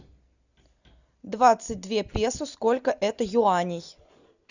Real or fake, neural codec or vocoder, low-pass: real; none; 7.2 kHz